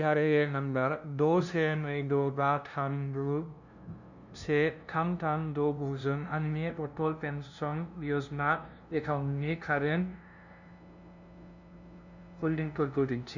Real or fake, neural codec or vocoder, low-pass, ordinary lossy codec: fake; codec, 16 kHz, 0.5 kbps, FunCodec, trained on LibriTTS, 25 frames a second; 7.2 kHz; none